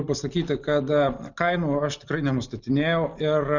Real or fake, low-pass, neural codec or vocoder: real; 7.2 kHz; none